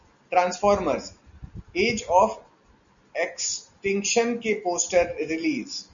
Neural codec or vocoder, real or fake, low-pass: none; real; 7.2 kHz